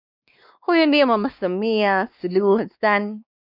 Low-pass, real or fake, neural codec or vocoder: 5.4 kHz; fake; codec, 16 kHz, 2 kbps, X-Codec, WavLM features, trained on Multilingual LibriSpeech